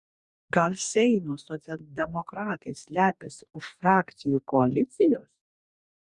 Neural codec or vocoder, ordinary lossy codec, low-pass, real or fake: codec, 44.1 kHz, 2.6 kbps, DAC; Opus, 64 kbps; 10.8 kHz; fake